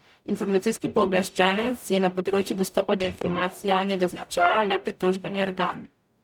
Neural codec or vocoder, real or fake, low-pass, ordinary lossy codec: codec, 44.1 kHz, 0.9 kbps, DAC; fake; 19.8 kHz; none